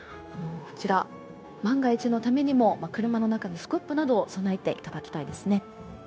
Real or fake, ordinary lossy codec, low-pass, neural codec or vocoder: fake; none; none; codec, 16 kHz, 0.9 kbps, LongCat-Audio-Codec